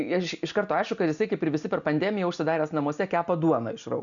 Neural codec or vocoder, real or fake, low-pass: none; real; 7.2 kHz